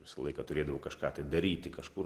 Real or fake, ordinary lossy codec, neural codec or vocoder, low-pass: real; Opus, 16 kbps; none; 14.4 kHz